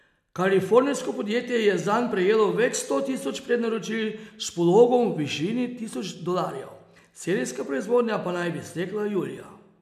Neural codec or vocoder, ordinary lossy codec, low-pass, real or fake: none; MP3, 96 kbps; 14.4 kHz; real